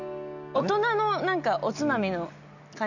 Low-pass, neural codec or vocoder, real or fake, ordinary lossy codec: 7.2 kHz; none; real; none